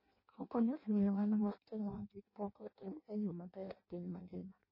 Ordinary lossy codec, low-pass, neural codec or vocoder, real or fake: MP3, 24 kbps; 5.4 kHz; codec, 16 kHz in and 24 kHz out, 0.6 kbps, FireRedTTS-2 codec; fake